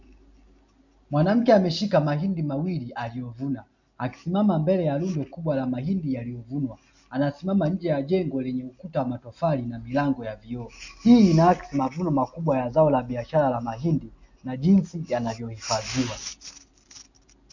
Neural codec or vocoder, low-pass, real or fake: none; 7.2 kHz; real